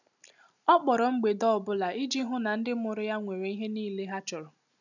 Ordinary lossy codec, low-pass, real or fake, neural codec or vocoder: none; 7.2 kHz; real; none